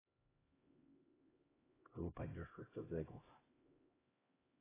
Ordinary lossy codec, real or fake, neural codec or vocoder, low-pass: AAC, 16 kbps; fake; codec, 16 kHz, 0.5 kbps, X-Codec, HuBERT features, trained on LibriSpeech; 3.6 kHz